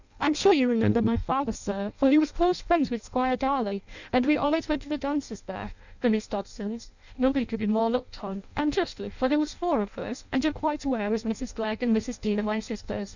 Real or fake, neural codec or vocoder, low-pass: fake; codec, 16 kHz in and 24 kHz out, 0.6 kbps, FireRedTTS-2 codec; 7.2 kHz